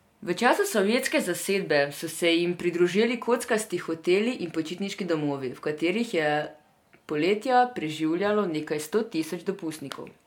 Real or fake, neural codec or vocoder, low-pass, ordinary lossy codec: real; none; 19.8 kHz; MP3, 96 kbps